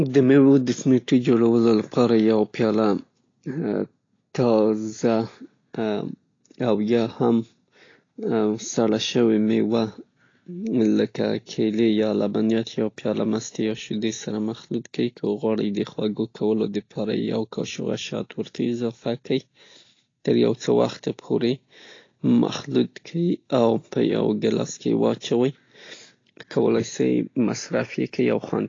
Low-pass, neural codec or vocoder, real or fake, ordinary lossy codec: 7.2 kHz; none; real; AAC, 32 kbps